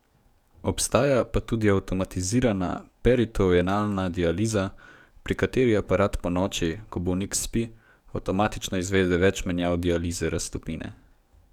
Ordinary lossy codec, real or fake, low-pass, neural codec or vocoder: none; fake; 19.8 kHz; codec, 44.1 kHz, 7.8 kbps, DAC